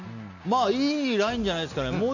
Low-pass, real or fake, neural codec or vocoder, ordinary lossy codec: 7.2 kHz; real; none; none